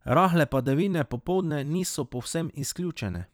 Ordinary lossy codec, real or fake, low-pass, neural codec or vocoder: none; fake; none; vocoder, 44.1 kHz, 128 mel bands every 256 samples, BigVGAN v2